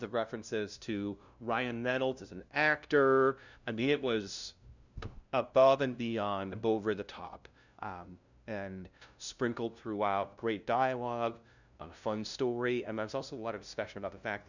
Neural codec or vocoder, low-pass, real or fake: codec, 16 kHz, 0.5 kbps, FunCodec, trained on LibriTTS, 25 frames a second; 7.2 kHz; fake